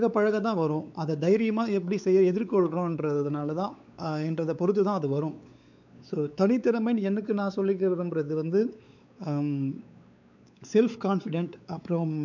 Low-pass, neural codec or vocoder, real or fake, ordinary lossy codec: 7.2 kHz; codec, 16 kHz, 4 kbps, X-Codec, WavLM features, trained on Multilingual LibriSpeech; fake; none